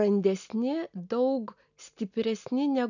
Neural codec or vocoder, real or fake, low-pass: none; real; 7.2 kHz